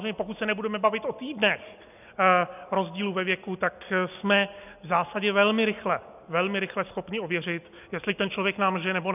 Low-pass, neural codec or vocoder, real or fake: 3.6 kHz; none; real